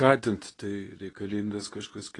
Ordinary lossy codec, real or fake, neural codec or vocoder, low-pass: AAC, 32 kbps; fake; codec, 24 kHz, 0.9 kbps, WavTokenizer, medium speech release version 2; 10.8 kHz